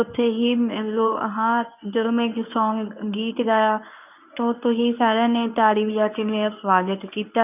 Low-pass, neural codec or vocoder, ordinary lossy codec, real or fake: 3.6 kHz; codec, 24 kHz, 0.9 kbps, WavTokenizer, medium speech release version 1; none; fake